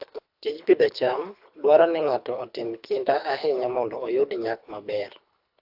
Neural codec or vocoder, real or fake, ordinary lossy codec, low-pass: codec, 24 kHz, 3 kbps, HILCodec; fake; AAC, 48 kbps; 5.4 kHz